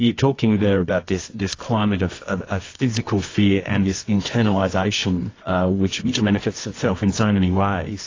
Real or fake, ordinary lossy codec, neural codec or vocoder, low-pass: fake; AAC, 32 kbps; codec, 24 kHz, 0.9 kbps, WavTokenizer, medium music audio release; 7.2 kHz